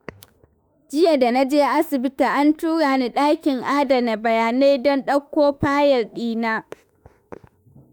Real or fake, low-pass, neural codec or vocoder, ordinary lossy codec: fake; none; autoencoder, 48 kHz, 32 numbers a frame, DAC-VAE, trained on Japanese speech; none